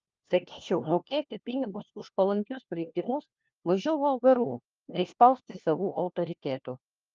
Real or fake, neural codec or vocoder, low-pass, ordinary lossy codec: fake; codec, 16 kHz, 1 kbps, FunCodec, trained on LibriTTS, 50 frames a second; 7.2 kHz; Opus, 24 kbps